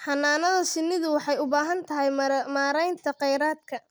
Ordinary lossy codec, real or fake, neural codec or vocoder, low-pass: none; real; none; none